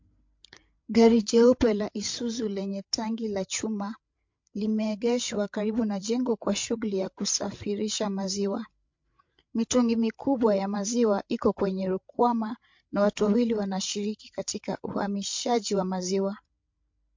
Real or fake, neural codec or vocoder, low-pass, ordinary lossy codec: fake; codec, 16 kHz, 8 kbps, FreqCodec, larger model; 7.2 kHz; MP3, 48 kbps